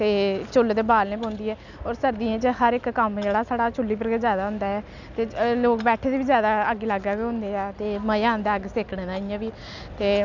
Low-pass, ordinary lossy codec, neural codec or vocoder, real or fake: 7.2 kHz; none; none; real